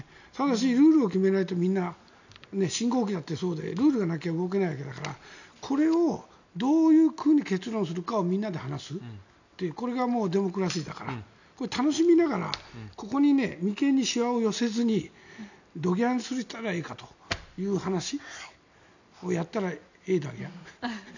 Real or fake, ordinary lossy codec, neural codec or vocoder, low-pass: real; none; none; 7.2 kHz